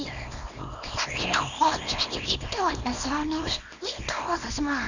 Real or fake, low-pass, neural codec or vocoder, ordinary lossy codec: fake; 7.2 kHz; codec, 24 kHz, 0.9 kbps, WavTokenizer, small release; none